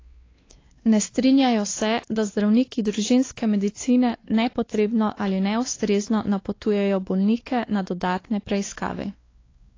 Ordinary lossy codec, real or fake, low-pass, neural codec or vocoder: AAC, 32 kbps; fake; 7.2 kHz; codec, 16 kHz, 2 kbps, X-Codec, WavLM features, trained on Multilingual LibriSpeech